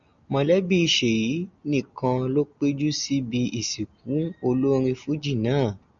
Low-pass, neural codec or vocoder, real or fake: 7.2 kHz; none; real